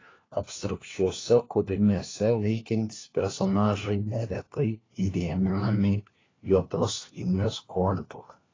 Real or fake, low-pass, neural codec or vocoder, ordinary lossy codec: fake; 7.2 kHz; codec, 16 kHz, 1 kbps, FunCodec, trained on LibriTTS, 50 frames a second; AAC, 32 kbps